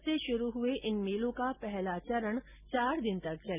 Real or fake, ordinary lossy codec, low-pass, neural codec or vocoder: real; none; 3.6 kHz; none